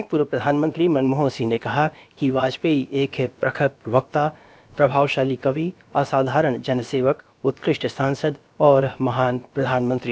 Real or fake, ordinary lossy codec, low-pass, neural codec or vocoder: fake; none; none; codec, 16 kHz, about 1 kbps, DyCAST, with the encoder's durations